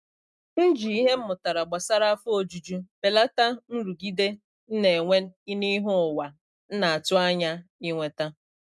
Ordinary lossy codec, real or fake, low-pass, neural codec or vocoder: none; real; none; none